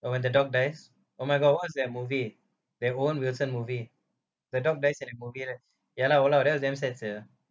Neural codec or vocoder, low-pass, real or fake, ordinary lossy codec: none; none; real; none